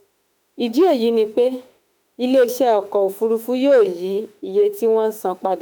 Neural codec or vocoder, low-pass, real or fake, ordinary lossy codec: autoencoder, 48 kHz, 32 numbers a frame, DAC-VAE, trained on Japanese speech; none; fake; none